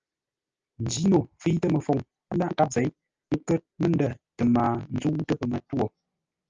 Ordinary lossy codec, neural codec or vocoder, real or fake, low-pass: Opus, 24 kbps; none; real; 7.2 kHz